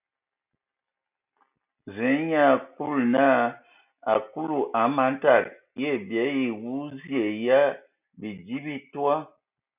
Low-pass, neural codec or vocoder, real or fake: 3.6 kHz; none; real